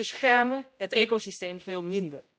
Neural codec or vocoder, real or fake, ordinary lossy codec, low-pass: codec, 16 kHz, 0.5 kbps, X-Codec, HuBERT features, trained on general audio; fake; none; none